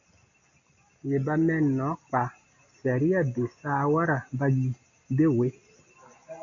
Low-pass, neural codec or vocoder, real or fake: 7.2 kHz; none; real